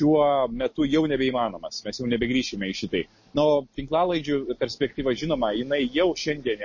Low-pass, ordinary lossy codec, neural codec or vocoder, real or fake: 7.2 kHz; MP3, 32 kbps; none; real